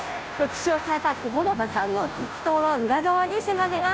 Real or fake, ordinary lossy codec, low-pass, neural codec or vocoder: fake; none; none; codec, 16 kHz, 0.5 kbps, FunCodec, trained on Chinese and English, 25 frames a second